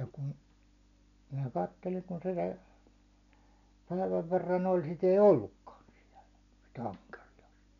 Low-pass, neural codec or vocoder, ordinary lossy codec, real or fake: 7.2 kHz; none; none; real